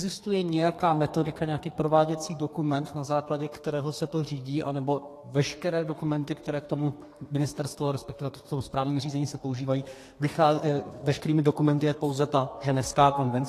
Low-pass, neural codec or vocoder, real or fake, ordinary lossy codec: 14.4 kHz; codec, 32 kHz, 1.9 kbps, SNAC; fake; AAC, 48 kbps